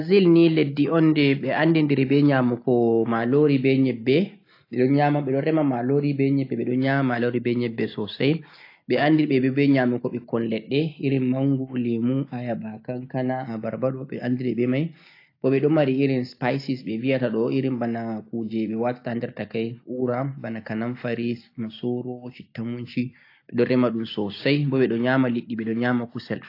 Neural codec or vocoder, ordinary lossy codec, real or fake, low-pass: none; AAC, 32 kbps; real; 5.4 kHz